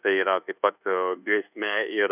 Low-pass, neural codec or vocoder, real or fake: 3.6 kHz; codec, 24 kHz, 1.2 kbps, DualCodec; fake